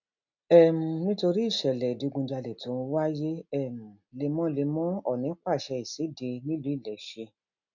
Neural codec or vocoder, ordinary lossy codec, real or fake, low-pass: none; none; real; 7.2 kHz